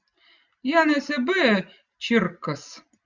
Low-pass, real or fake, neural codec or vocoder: 7.2 kHz; real; none